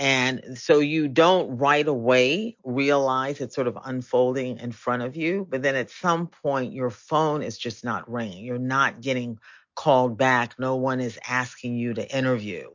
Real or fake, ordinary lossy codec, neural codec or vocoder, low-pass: real; MP3, 48 kbps; none; 7.2 kHz